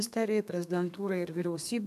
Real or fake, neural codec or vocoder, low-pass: fake; codec, 32 kHz, 1.9 kbps, SNAC; 14.4 kHz